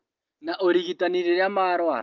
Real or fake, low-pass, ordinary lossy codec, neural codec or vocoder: real; 7.2 kHz; Opus, 24 kbps; none